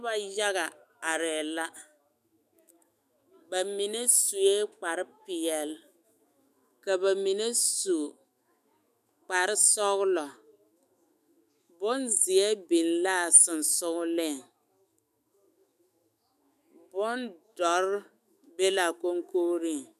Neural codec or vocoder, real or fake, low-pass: autoencoder, 48 kHz, 128 numbers a frame, DAC-VAE, trained on Japanese speech; fake; 14.4 kHz